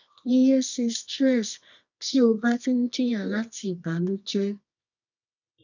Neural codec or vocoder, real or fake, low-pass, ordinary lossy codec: codec, 24 kHz, 0.9 kbps, WavTokenizer, medium music audio release; fake; 7.2 kHz; none